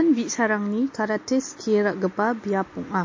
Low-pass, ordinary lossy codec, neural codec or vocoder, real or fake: 7.2 kHz; MP3, 32 kbps; none; real